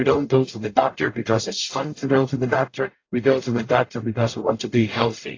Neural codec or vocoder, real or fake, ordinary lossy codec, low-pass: codec, 44.1 kHz, 0.9 kbps, DAC; fake; AAC, 32 kbps; 7.2 kHz